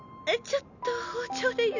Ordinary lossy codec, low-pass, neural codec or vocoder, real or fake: none; 7.2 kHz; none; real